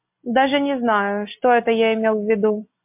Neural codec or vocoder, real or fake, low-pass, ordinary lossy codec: none; real; 3.6 kHz; MP3, 32 kbps